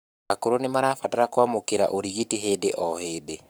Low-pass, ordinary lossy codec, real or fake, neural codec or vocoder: none; none; fake; codec, 44.1 kHz, 7.8 kbps, Pupu-Codec